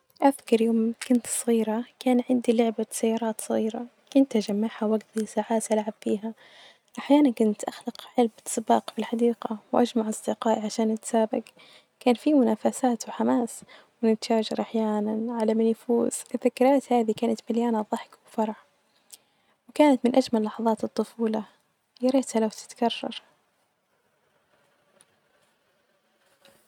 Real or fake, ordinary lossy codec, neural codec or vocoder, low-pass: real; none; none; 19.8 kHz